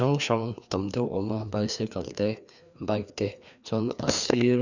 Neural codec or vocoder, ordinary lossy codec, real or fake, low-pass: codec, 16 kHz, 2 kbps, FreqCodec, larger model; none; fake; 7.2 kHz